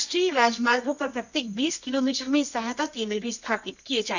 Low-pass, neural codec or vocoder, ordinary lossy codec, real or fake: 7.2 kHz; codec, 24 kHz, 0.9 kbps, WavTokenizer, medium music audio release; none; fake